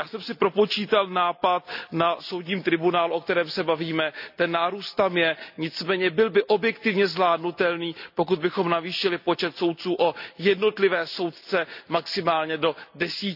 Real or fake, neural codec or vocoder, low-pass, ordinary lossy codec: real; none; 5.4 kHz; none